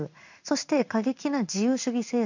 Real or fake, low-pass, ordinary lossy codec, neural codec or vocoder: fake; 7.2 kHz; none; codec, 16 kHz in and 24 kHz out, 1 kbps, XY-Tokenizer